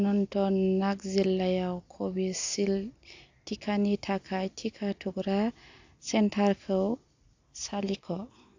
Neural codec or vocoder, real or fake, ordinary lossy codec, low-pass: none; real; AAC, 48 kbps; 7.2 kHz